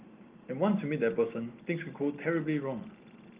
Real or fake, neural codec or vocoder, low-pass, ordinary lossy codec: real; none; 3.6 kHz; Opus, 32 kbps